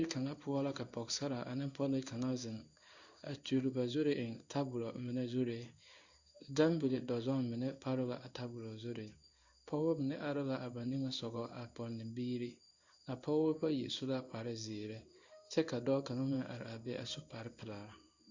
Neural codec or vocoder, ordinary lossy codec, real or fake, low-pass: codec, 16 kHz in and 24 kHz out, 1 kbps, XY-Tokenizer; Opus, 64 kbps; fake; 7.2 kHz